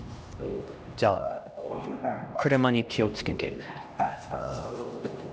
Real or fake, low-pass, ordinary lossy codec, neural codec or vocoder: fake; none; none; codec, 16 kHz, 1 kbps, X-Codec, HuBERT features, trained on LibriSpeech